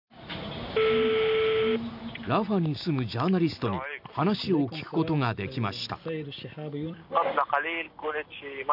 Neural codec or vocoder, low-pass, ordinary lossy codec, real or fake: none; 5.4 kHz; none; real